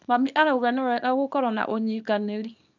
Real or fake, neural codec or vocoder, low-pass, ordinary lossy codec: fake; codec, 24 kHz, 0.9 kbps, WavTokenizer, small release; 7.2 kHz; none